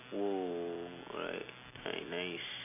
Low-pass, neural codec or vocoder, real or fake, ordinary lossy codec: 3.6 kHz; none; real; none